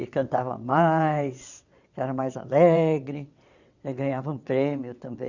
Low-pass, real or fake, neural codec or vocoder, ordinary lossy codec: 7.2 kHz; fake; vocoder, 22.05 kHz, 80 mel bands, WaveNeXt; Opus, 64 kbps